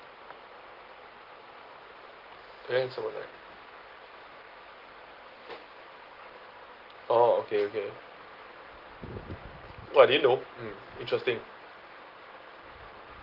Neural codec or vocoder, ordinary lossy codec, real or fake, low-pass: none; Opus, 16 kbps; real; 5.4 kHz